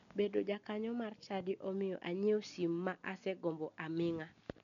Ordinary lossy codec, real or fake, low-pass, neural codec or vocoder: none; real; 7.2 kHz; none